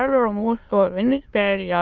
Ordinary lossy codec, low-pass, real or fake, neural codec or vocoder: Opus, 32 kbps; 7.2 kHz; fake; autoencoder, 22.05 kHz, a latent of 192 numbers a frame, VITS, trained on many speakers